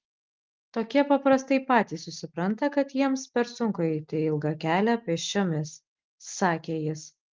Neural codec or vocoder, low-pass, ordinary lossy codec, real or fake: none; 7.2 kHz; Opus, 32 kbps; real